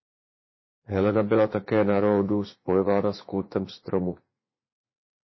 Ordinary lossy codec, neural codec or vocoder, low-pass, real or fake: MP3, 24 kbps; vocoder, 44.1 kHz, 80 mel bands, Vocos; 7.2 kHz; fake